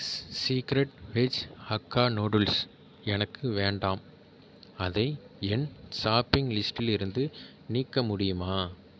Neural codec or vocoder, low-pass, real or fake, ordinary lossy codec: none; none; real; none